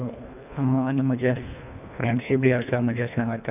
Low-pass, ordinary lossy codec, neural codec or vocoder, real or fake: 3.6 kHz; none; codec, 24 kHz, 1.5 kbps, HILCodec; fake